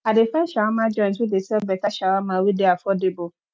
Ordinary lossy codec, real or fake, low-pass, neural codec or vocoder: none; real; none; none